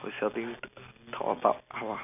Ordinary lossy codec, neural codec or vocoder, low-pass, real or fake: none; none; 3.6 kHz; real